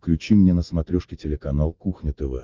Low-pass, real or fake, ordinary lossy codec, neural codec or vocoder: 7.2 kHz; real; Opus, 32 kbps; none